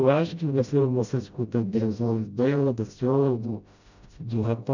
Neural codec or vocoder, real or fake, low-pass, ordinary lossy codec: codec, 16 kHz, 0.5 kbps, FreqCodec, smaller model; fake; 7.2 kHz; none